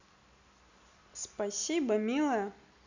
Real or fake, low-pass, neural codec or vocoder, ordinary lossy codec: real; 7.2 kHz; none; none